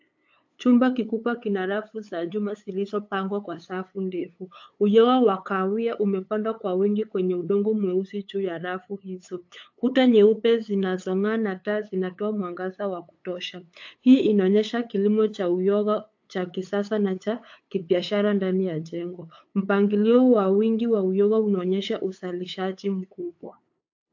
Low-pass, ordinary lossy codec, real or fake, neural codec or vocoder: 7.2 kHz; AAC, 48 kbps; fake; codec, 16 kHz, 8 kbps, FunCodec, trained on LibriTTS, 25 frames a second